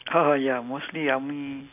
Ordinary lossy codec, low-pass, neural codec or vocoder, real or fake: none; 3.6 kHz; none; real